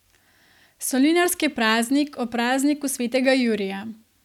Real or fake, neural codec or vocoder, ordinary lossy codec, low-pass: real; none; none; 19.8 kHz